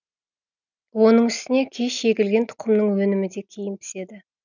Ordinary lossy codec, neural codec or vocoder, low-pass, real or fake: none; none; 7.2 kHz; real